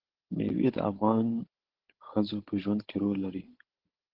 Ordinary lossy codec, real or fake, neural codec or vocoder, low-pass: Opus, 16 kbps; fake; codec, 16 kHz, 16 kbps, FunCodec, trained on Chinese and English, 50 frames a second; 5.4 kHz